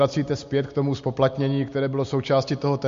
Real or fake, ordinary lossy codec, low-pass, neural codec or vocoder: real; MP3, 48 kbps; 7.2 kHz; none